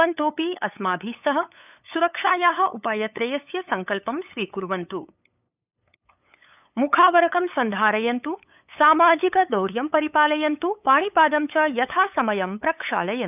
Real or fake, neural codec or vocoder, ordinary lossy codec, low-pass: fake; codec, 16 kHz, 8 kbps, FunCodec, trained on LibriTTS, 25 frames a second; none; 3.6 kHz